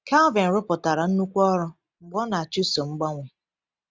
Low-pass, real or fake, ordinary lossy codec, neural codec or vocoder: none; real; none; none